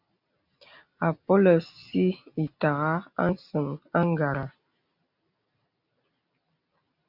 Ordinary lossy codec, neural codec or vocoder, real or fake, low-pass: MP3, 48 kbps; none; real; 5.4 kHz